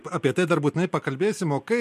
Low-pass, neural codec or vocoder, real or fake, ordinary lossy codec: 14.4 kHz; none; real; MP3, 64 kbps